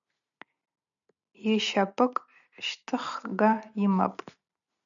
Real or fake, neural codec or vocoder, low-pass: real; none; 7.2 kHz